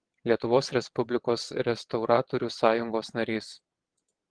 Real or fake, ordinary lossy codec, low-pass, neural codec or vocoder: fake; Opus, 16 kbps; 9.9 kHz; vocoder, 22.05 kHz, 80 mel bands, WaveNeXt